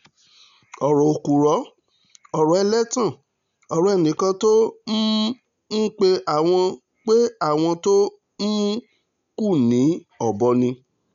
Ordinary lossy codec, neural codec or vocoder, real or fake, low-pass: MP3, 96 kbps; none; real; 7.2 kHz